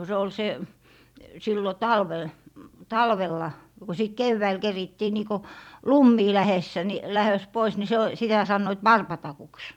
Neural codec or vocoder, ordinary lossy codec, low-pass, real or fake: vocoder, 44.1 kHz, 128 mel bands every 256 samples, BigVGAN v2; none; 19.8 kHz; fake